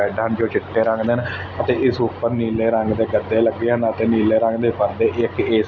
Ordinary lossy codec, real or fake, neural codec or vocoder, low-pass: none; real; none; 7.2 kHz